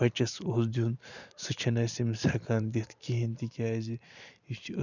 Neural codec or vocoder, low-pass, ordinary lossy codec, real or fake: vocoder, 44.1 kHz, 128 mel bands every 512 samples, BigVGAN v2; 7.2 kHz; none; fake